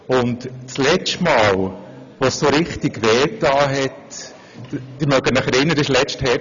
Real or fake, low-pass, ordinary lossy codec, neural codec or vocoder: real; 7.2 kHz; none; none